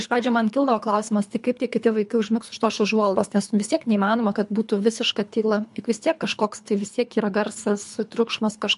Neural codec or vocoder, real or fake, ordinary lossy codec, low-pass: codec, 24 kHz, 3 kbps, HILCodec; fake; MP3, 64 kbps; 10.8 kHz